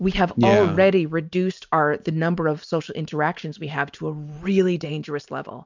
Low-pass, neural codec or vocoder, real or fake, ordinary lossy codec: 7.2 kHz; none; real; MP3, 64 kbps